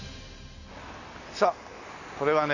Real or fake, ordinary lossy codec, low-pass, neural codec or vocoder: real; none; 7.2 kHz; none